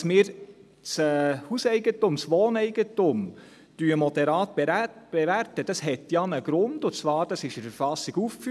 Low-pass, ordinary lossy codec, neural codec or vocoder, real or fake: none; none; none; real